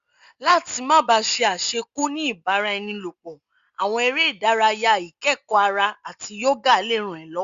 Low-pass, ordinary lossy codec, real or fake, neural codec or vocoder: 7.2 kHz; Opus, 64 kbps; real; none